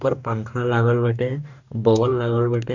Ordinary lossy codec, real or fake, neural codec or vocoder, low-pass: none; fake; codec, 44.1 kHz, 2.6 kbps, DAC; 7.2 kHz